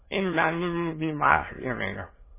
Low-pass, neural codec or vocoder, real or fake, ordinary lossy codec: 3.6 kHz; autoencoder, 22.05 kHz, a latent of 192 numbers a frame, VITS, trained on many speakers; fake; MP3, 16 kbps